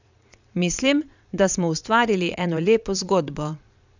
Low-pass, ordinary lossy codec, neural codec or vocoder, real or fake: 7.2 kHz; none; vocoder, 22.05 kHz, 80 mel bands, Vocos; fake